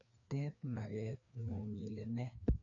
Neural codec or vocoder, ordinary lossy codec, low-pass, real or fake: codec, 16 kHz, 2 kbps, FreqCodec, larger model; none; 7.2 kHz; fake